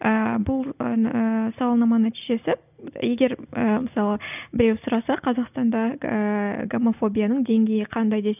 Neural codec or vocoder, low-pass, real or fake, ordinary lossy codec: none; 3.6 kHz; real; none